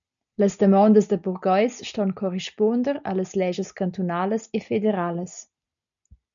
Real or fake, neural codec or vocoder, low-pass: real; none; 7.2 kHz